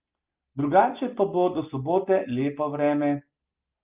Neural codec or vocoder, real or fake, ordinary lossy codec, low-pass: none; real; Opus, 24 kbps; 3.6 kHz